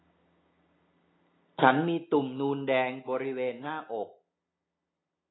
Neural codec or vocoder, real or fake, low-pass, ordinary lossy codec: none; real; 7.2 kHz; AAC, 16 kbps